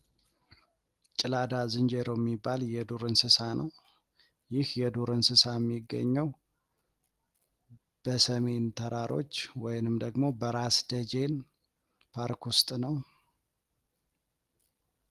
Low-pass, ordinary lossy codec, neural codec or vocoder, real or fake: 14.4 kHz; Opus, 24 kbps; none; real